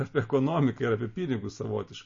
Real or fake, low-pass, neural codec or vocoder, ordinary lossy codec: real; 7.2 kHz; none; MP3, 32 kbps